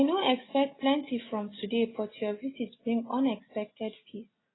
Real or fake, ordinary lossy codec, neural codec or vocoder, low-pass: real; AAC, 16 kbps; none; 7.2 kHz